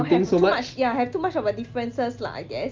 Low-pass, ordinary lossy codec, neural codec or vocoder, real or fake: 7.2 kHz; Opus, 24 kbps; none; real